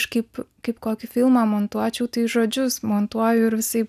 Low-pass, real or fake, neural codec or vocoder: 14.4 kHz; real; none